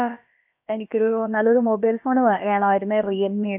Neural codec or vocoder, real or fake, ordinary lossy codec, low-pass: codec, 16 kHz, about 1 kbps, DyCAST, with the encoder's durations; fake; none; 3.6 kHz